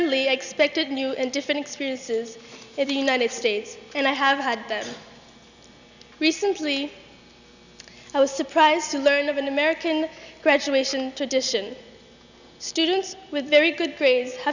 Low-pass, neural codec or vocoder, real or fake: 7.2 kHz; none; real